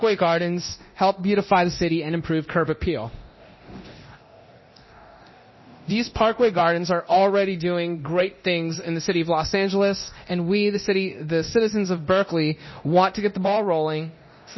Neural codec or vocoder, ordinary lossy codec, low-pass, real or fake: codec, 24 kHz, 0.9 kbps, DualCodec; MP3, 24 kbps; 7.2 kHz; fake